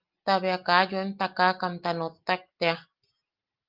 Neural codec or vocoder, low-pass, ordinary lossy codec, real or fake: none; 5.4 kHz; Opus, 24 kbps; real